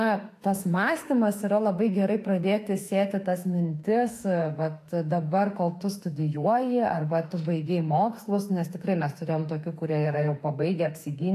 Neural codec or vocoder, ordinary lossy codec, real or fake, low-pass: autoencoder, 48 kHz, 32 numbers a frame, DAC-VAE, trained on Japanese speech; AAC, 64 kbps; fake; 14.4 kHz